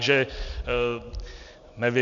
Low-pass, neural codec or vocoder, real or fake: 7.2 kHz; none; real